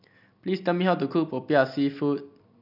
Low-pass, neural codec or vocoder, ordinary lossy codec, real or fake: 5.4 kHz; none; none; real